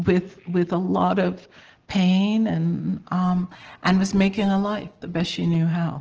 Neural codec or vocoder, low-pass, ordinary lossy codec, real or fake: none; 7.2 kHz; Opus, 16 kbps; real